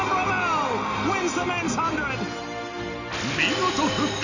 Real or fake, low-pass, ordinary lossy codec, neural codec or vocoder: real; 7.2 kHz; none; none